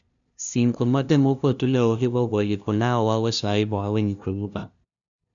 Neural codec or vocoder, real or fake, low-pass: codec, 16 kHz, 0.5 kbps, FunCodec, trained on LibriTTS, 25 frames a second; fake; 7.2 kHz